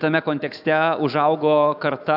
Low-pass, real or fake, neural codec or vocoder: 5.4 kHz; fake; autoencoder, 48 kHz, 128 numbers a frame, DAC-VAE, trained on Japanese speech